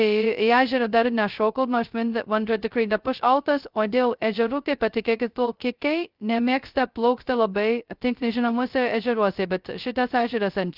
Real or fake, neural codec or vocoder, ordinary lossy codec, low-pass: fake; codec, 16 kHz, 0.2 kbps, FocalCodec; Opus, 32 kbps; 5.4 kHz